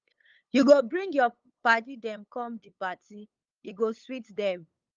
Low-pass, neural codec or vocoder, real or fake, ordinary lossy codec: 7.2 kHz; codec, 16 kHz, 8 kbps, FunCodec, trained on LibriTTS, 25 frames a second; fake; Opus, 32 kbps